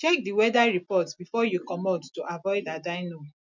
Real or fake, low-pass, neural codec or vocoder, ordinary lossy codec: real; 7.2 kHz; none; none